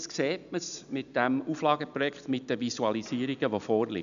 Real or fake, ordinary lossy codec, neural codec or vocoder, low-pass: real; none; none; 7.2 kHz